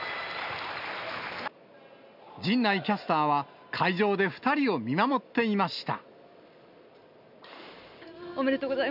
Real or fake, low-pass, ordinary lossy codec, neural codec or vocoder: real; 5.4 kHz; none; none